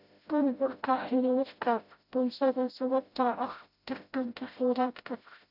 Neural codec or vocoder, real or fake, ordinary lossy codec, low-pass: codec, 16 kHz, 0.5 kbps, FreqCodec, smaller model; fake; none; 5.4 kHz